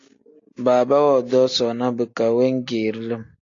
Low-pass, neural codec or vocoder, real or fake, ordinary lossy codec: 7.2 kHz; none; real; AAC, 48 kbps